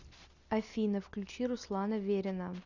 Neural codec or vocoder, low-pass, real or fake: none; 7.2 kHz; real